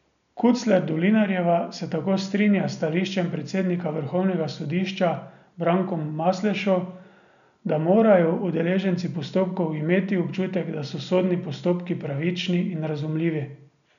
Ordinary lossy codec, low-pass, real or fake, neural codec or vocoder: none; 7.2 kHz; real; none